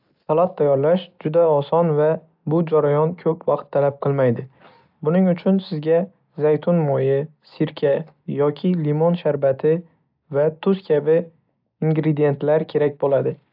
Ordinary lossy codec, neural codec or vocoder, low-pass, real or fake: AAC, 48 kbps; none; 5.4 kHz; real